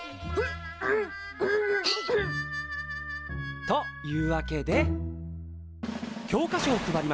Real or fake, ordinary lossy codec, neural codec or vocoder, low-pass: real; none; none; none